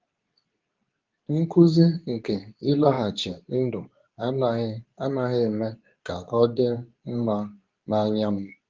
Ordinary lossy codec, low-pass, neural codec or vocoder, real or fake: Opus, 32 kbps; 7.2 kHz; codec, 24 kHz, 0.9 kbps, WavTokenizer, medium speech release version 2; fake